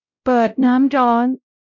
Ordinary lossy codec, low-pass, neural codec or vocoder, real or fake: none; 7.2 kHz; codec, 16 kHz, 0.5 kbps, X-Codec, WavLM features, trained on Multilingual LibriSpeech; fake